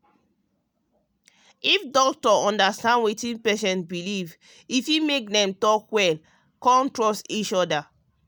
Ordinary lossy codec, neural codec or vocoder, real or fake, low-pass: none; none; real; none